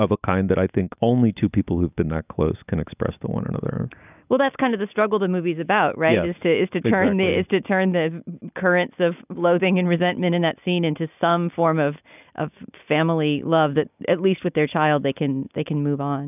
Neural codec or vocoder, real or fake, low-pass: none; real; 3.6 kHz